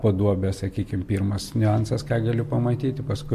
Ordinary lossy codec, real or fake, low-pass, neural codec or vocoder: MP3, 64 kbps; real; 14.4 kHz; none